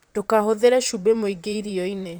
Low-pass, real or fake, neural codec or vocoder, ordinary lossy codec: none; fake; vocoder, 44.1 kHz, 128 mel bands, Pupu-Vocoder; none